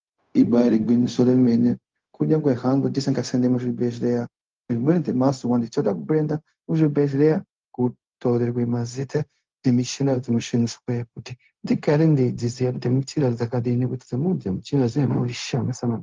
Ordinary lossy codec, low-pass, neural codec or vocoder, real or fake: Opus, 32 kbps; 7.2 kHz; codec, 16 kHz, 0.4 kbps, LongCat-Audio-Codec; fake